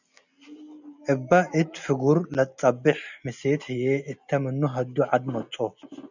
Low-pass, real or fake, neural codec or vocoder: 7.2 kHz; real; none